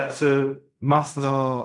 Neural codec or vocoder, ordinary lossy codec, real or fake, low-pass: codec, 16 kHz in and 24 kHz out, 0.4 kbps, LongCat-Audio-Codec, fine tuned four codebook decoder; MP3, 96 kbps; fake; 10.8 kHz